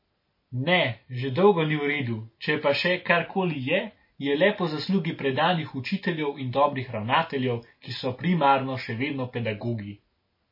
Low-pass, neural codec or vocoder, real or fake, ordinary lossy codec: 5.4 kHz; none; real; MP3, 24 kbps